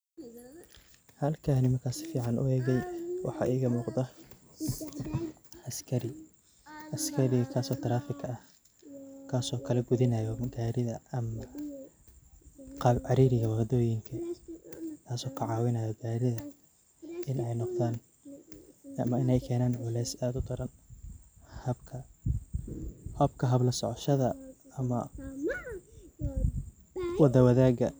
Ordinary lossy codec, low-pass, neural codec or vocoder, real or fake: none; none; none; real